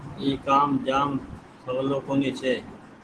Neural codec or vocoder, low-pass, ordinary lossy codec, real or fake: none; 10.8 kHz; Opus, 16 kbps; real